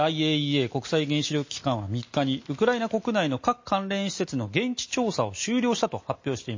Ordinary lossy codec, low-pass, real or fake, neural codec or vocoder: MP3, 32 kbps; 7.2 kHz; real; none